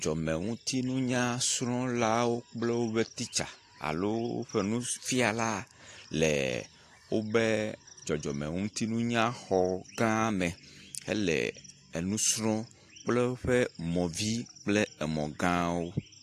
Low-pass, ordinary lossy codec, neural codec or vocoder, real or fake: 14.4 kHz; AAC, 48 kbps; none; real